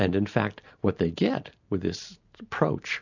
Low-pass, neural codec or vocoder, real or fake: 7.2 kHz; none; real